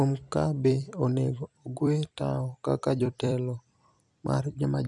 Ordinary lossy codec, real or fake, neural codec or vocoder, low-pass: none; fake; vocoder, 44.1 kHz, 128 mel bands every 256 samples, BigVGAN v2; 10.8 kHz